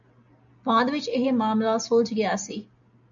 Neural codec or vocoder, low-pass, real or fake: none; 7.2 kHz; real